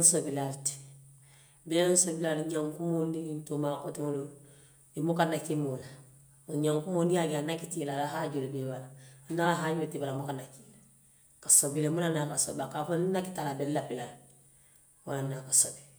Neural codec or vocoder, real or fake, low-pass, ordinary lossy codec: vocoder, 48 kHz, 128 mel bands, Vocos; fake; none; none